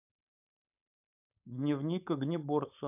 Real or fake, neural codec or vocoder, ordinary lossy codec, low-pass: fake; codec, 16 kHz, 4.8 kbps, FACodec; none; 3.6 kHz